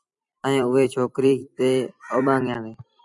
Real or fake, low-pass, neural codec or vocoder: fake; 10.8 kHz; vocoder, 44.1 kHz, 128 mel bands every 256 samples, BigVGAN v2